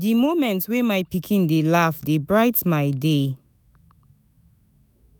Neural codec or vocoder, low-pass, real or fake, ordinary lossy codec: autoencoder, 48 kHz, 128 numbers a frame, DAC-VAE, trained on Japanese speech; none; fake; none